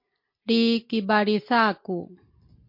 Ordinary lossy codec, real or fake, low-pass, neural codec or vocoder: MP3, 32 kbps; real; 5.4 kHz; none